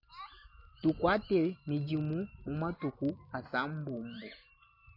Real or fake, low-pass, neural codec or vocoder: real; 5.4 kHz; none